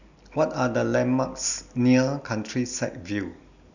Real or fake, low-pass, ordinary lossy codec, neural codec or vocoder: real; 7.2 kHz; none; none